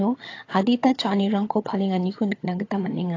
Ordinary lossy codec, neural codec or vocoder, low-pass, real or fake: AAC, 32 kbps; vocoder, 22.05 kHz, 80 mel bands, HiFi-GAN; 7.2 kHz; fake